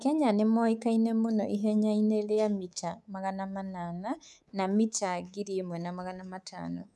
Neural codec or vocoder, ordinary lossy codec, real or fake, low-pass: codec, 24 kHz, 3.1 kbps, DualCodec; none; fake; none